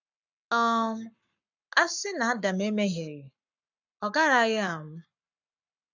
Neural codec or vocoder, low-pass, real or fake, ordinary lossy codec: codec, 44.1 kHz, 7.8 kbps, Pupu-Codec; 7.2 kHz; fake; none